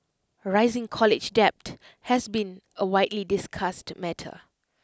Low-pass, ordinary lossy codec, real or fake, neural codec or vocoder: none; none; real; none